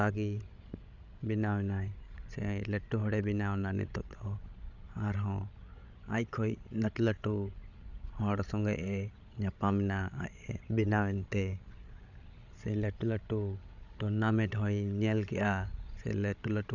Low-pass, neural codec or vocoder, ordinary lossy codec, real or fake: 7.2 kHz; codec, 16 kHz, 16 kbps, FreqCodec, larger model; none; fake